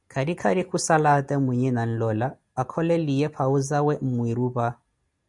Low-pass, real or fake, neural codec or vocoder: 10.8 kHz; real; none